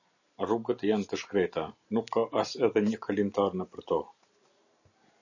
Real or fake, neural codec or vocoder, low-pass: real; none; 7.2 kHz